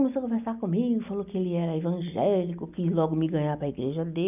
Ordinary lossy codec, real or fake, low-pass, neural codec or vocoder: none; real; 3.6 kHz; none